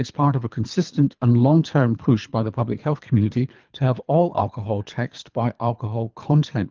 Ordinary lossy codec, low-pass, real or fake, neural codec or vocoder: Opus, 24 kbps; 7.2 kHz; fake; codec, 24 kHz, 3 kbps, HILCodec